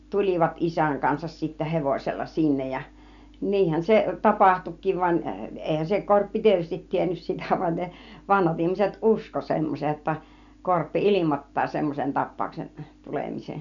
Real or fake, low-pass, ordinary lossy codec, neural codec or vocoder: real; 7.2 kHz; none; none